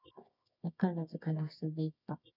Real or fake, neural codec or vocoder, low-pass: fake; codec, 24 kHz, 0.9 kbps, WavTokenizer, medium music audio release; 5.4 kHz